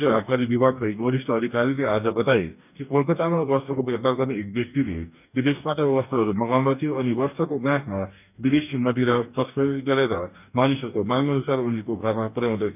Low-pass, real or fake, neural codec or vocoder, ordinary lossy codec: 3.6 kHz; fake; codec, 44.1 kHz, 2.6 kbps, DAC; none